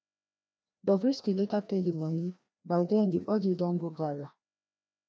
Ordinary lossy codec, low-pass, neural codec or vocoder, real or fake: none; none; codec, 16 kHz, 1 kbps, FreqCodec, larger model; fake